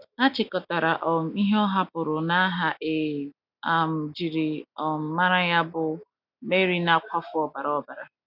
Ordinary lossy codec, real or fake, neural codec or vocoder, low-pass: none; real; none; 5.4 kHz